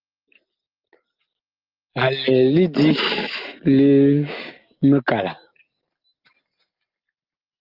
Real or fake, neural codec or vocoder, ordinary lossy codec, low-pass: real; none; Opus, 32 kbps; 5.4 kHz